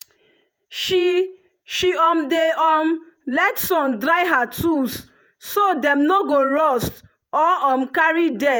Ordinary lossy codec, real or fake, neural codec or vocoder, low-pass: none; fake; vocoder, 48 kHz, 128 mel bands, Vocos; none